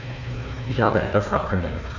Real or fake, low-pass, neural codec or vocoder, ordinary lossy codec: fake; 7.2 kHz; codec, 16 kHz, 1 kbps, FunCodec, trained on Chinese and English, 50 frames a second; none